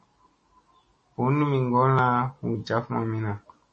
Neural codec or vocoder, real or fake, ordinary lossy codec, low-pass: none; real; MP3, 32 kbps; 10.8 kHz